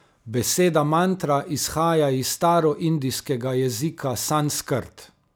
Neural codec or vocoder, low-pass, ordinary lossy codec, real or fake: none; none; none; real